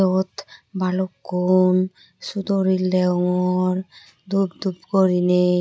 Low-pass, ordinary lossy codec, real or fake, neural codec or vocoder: none; none; real; none